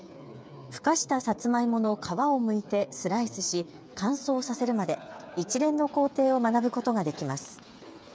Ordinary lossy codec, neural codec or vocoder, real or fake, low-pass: none; codec, 16 kHz, 8 kbps, FreqCodec, smaller model; fake; none